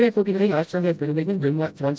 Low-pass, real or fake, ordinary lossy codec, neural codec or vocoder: none; fake; none; codec, 16 kHz, 0.5 kbps, FreqCodec, smaller model